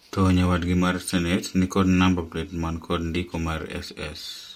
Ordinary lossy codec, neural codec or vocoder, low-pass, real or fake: MP3, 64 kbps; none; 19.8 kHz; real